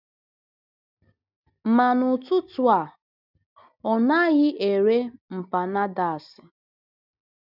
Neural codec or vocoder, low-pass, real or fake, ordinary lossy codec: none; 5.4 kHz; real; none